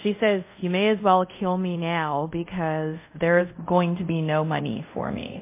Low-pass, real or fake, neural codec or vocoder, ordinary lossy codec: 3.6 kHz; fake; codec, 24 kHz, 0.5 kbps, DualCodec; MP3, 24 kbps